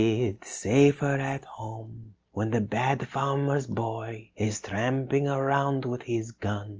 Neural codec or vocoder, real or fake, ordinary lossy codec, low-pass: none; real; Opus, 24 kbps; 7.2 kHz